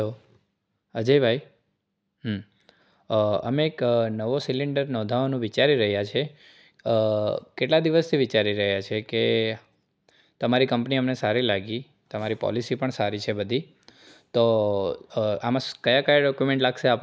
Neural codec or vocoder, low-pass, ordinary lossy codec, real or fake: none; none; none; real